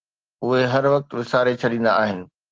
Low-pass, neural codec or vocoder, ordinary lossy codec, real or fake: 7.2 kHz; none; Opus, 16 kbps; real